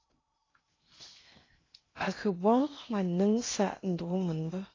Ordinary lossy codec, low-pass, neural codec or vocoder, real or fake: AAC, 32 kbps; 7.2 kHz; codec, 16 kHz in and 24 kHz out, 0.6 kbps, FocalCodec, streaming, 2048 codes; fake